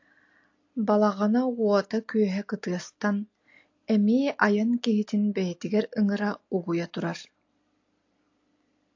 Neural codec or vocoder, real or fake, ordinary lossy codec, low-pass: none; real; AAC, 48 kbps; 7.2 kHz